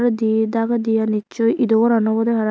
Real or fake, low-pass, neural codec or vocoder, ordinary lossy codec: real; none; none; none